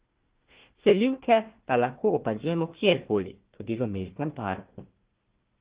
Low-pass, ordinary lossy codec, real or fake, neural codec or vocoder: 3.6 kHz; Opus, 16 kbps; fake; codec, 16 kHz, 1 kbps, FunCodec, trained on Chinese and English, 50 frames a second